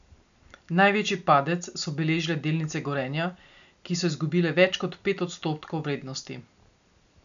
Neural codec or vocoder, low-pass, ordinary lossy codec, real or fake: none; 7.2 kHz; none; real